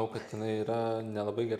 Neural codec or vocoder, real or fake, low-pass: none; real; 14.4 kHz